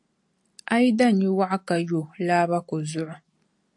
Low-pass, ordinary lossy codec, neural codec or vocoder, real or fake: 10.8 kHz; AAC, 64 kbps; none; real